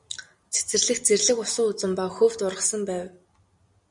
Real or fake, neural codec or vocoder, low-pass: real; none; 10.8 kHz